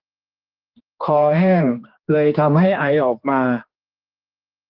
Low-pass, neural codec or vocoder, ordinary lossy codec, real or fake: 5.4 kHz; codec, 16 kHz, 2 kbps, X-Codec, HuBERT features, trained on general audio; Opus, 16 kbps; fake